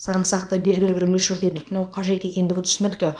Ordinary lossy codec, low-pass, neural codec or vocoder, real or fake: none; 9.9 kHz; codec, 24 kHz, 0.9 kbps, WavTokenizer, small release; fake